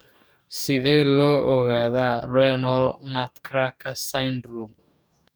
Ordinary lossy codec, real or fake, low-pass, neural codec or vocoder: none; fake; none; codec, 44.1 kHz, 2.6 kbps, DAC